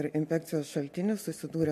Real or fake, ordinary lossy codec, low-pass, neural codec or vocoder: real; MP3, 64 kbps; 14.4 kHz; none